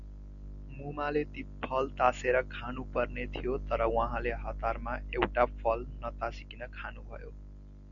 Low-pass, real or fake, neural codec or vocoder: 7.2 kHz; real; none